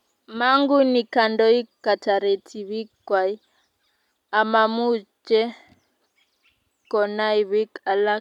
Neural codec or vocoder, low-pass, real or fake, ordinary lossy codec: none; 19.8 kHz; real; none